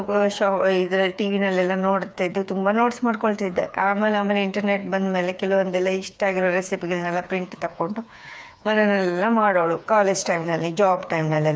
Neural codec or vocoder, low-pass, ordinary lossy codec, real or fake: codec, 16 kHz, 4 kbps, FreqCodec, smaller model; none; none; fake